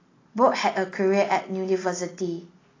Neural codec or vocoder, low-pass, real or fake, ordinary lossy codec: none; 7.2 kHz; real; AAC, 32 kbps